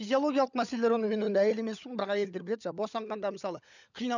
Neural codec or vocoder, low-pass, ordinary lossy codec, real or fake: codec, 16 kHz, 16 kbps, FunCodec, trained on LibriTTS, 50 frames a second; 7.2 kHz; none; fake